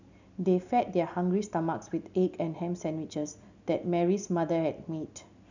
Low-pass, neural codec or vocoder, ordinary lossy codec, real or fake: 7.2 kHz; none; none; real